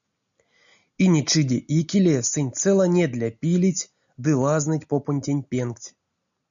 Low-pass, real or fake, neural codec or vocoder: 7.2 kHz; real; none